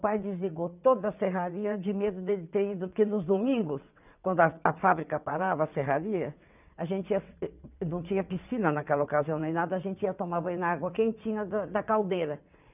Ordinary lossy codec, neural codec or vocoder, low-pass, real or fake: none; vocoder, 22.05 kHz, 80 mel bands, WaveNeXt; 3.6 kHz; fake